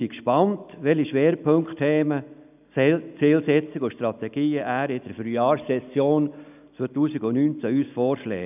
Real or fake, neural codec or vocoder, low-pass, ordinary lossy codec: real; none; 3.6 kHz; none